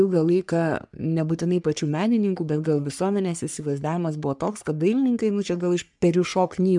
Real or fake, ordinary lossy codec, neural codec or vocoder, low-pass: fake; MP3, 96 kbps; codec, 44.1 kHz, 3.4 kbps, Pupu-Codec; 10.8 kHz